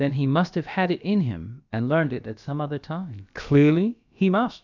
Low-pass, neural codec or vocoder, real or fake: 7.2 kHz; codec, 16 kHz, about 1 kbps, DyCAST, with the encoder's durations; fake